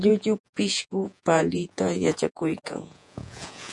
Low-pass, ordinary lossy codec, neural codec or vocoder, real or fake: 10.8 kHz; MP3, 96 kbps; vocoder, 48 kHz, 128 mel bands, Vocos; fake